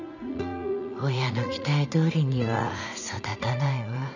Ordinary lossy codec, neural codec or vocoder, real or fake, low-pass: none; vocoder, 44.1 kHz, 80 mel bands, Vocos; fake; 7.2 kHz